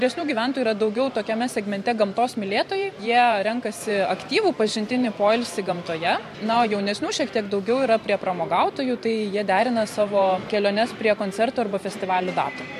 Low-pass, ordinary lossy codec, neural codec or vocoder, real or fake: 14.4 kHz; MP3, 64 kbps; vocoder, 44.1 kHz, 128 mel bands every 512 samples, BigVGAN v2; fake